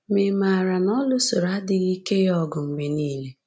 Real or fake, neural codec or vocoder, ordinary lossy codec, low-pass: real; none; none; none